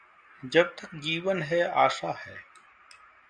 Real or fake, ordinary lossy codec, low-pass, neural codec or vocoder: real; Opus, 64 kbps; 9.9 kHz; none